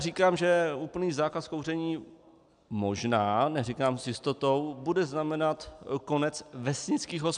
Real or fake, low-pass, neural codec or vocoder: real; 9.9 kHz; none